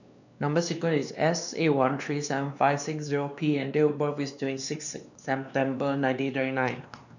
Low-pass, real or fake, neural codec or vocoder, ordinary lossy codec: 7.2 kHz; fake; codec, 16 kHz, 2 kbps, X-Codec, WavLM features, trained on Multilingual LibriSpeech; none